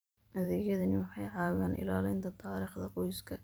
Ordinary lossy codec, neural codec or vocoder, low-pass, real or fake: none; vocoder, 44.1 kHz, 128 mel bands every 512 samples, BigVGAN v2; none; fake